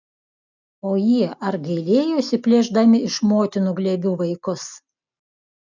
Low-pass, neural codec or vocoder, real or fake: 7.2 kHz; none; real